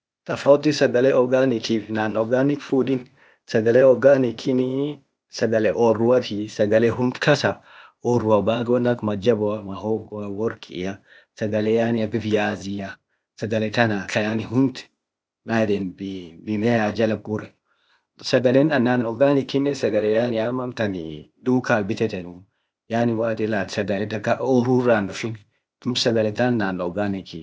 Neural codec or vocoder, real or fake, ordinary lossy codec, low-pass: codec, 16 kHz, 0.8 kbps, ZipCodec; fake; none; none